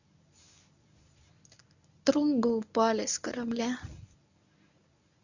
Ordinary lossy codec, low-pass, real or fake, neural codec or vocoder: none; 7.2 kHz; fake; codec, 24 kHz, 0.9 kbps, WavTokenizer, medium speech release version 1